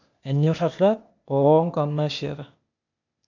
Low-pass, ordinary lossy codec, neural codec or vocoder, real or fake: 7.2 kHz; none; codec, 16 kHz, 0.8 kbps, ZipCodec; fake